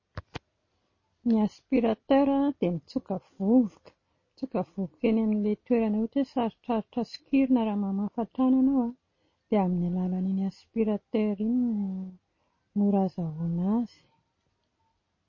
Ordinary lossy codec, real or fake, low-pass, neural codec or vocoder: MP3, 32 kbps; real; 7.2 kHz; none